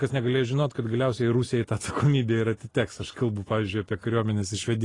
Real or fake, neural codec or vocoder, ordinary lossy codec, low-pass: real; none; AAC, 32 kbps; 10.8 kHz